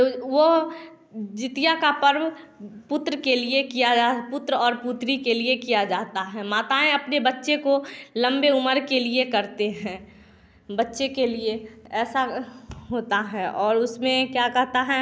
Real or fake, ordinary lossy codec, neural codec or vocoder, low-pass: real; none; none; none